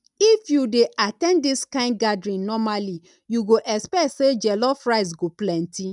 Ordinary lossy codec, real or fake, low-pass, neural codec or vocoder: none; real; 10.8 kHz; none